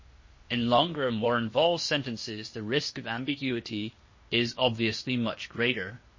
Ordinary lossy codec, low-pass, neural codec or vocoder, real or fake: MP3, 32 kbps; 7.2 kHz; codec, 16 kHz, 0.8 kbps, ZipCodec; fake